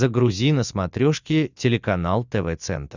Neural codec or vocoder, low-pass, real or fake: none; 7.2 kHz; real